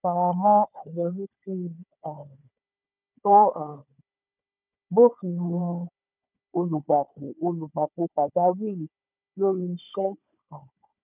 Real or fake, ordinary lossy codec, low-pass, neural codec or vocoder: fake; none; 3.6 kHz; codec, 16 kHz, 4 kbps, FunCodec, trained on Chinese and English, 50 frames a second